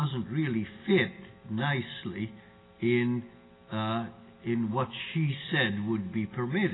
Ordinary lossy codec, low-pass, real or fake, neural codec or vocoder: AAC, 16 kbps; 7.2 kHz; real; none